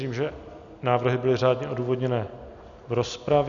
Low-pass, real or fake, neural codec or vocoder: 7.2 kHz; real; none